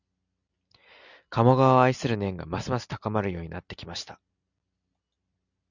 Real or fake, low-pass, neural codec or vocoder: real; 7.2 kHz; none